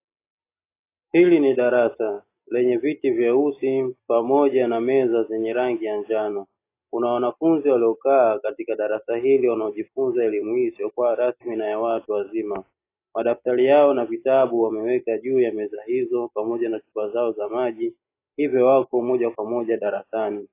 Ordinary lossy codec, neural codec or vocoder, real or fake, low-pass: AAC, 24 kbps; none; real; 3.6 kHz